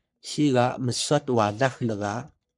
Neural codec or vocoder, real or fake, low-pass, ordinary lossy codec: codec, 44.1 kHz, 3.4 kbps, Pupu-Codec; fake; 10.8 kHz; MP3, 96 kbps